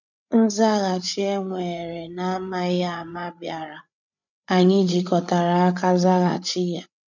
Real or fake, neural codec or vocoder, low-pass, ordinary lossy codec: fake; codec, 16 kHz, 8 kbps, FreqCodec, larger model; 7.2 kHz; none